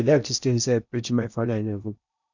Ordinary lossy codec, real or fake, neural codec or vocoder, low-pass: none; fake; codec, 16 kHz in and 24 kHz out, 0.8 kbps, FocalCodec, streaming, 65536 codes; 7.2 kHz